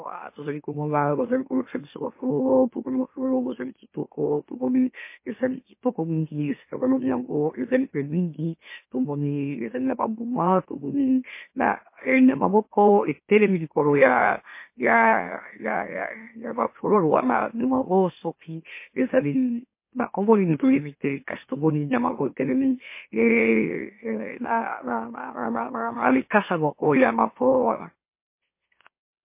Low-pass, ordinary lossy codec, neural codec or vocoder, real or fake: 3.6 kHz; MP3, 24 kbps; autoencoder, 44.1 kHz, a latent of 192 numbers a frame, MeloTTS; fake